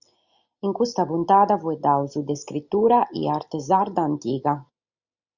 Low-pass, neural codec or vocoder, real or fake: 7.2 kHz; none; real